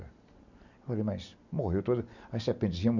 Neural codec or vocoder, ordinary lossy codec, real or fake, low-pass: none; none; real; 7.2 kHz